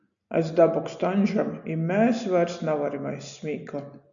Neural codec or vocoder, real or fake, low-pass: none; real; 7.2 kHz